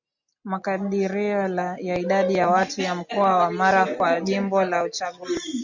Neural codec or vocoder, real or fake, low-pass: none; real; 7.2 kHz